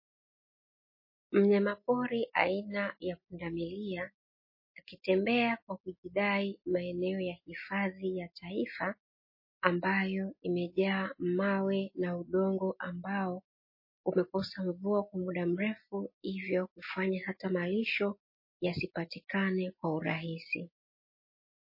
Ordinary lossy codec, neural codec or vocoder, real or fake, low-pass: MP3, 24 kbps; none; real; 5.4 kHz